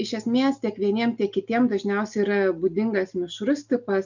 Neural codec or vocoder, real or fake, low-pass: none; real; 7.2 kHz